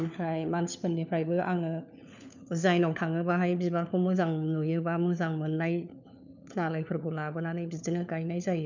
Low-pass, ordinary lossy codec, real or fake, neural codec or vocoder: 7.2 kHz; none; fake; codec, 16 kHz, 4 kbps, FunCodec, trained on LibriTTS, 50 frames a second